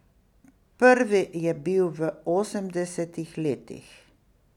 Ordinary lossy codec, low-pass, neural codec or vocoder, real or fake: none; 19.8 kHz; none; real